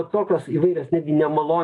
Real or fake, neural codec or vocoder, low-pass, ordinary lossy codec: real; none; 10.8 kHz; AAC, 48 kbps